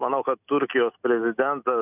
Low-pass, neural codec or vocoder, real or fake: 3.6 kHz; none; real